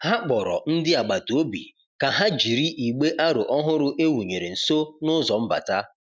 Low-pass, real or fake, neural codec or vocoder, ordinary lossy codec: none; real; none; none